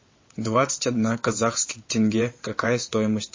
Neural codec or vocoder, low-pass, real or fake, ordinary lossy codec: vocoder, 22.05 kHz, 80 mel bands, WaveNeXt; 7.2 kHz; fake; MP3, 32 kbps